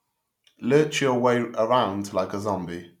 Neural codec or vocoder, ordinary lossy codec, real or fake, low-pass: none; none; real; 19.8 kHz